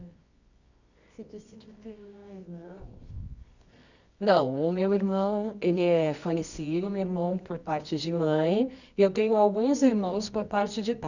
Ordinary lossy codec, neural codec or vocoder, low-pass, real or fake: none; codec, 24 kHz, 0.9 kbps, WavTokenizer, medium music audio release; 7.2 kHz; fake